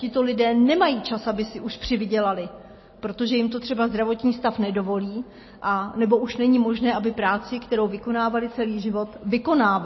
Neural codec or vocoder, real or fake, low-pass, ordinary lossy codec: none; real; 7.2 kHz; MP3, 24 kbps